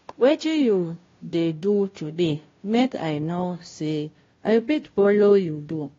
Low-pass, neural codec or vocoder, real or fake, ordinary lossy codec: 7.2 kHz; codec, 16 kHz, 0.5 kbps, FunCodec, trained on Chinese and English, 25 frames a second; fake; AAC, 32 kbps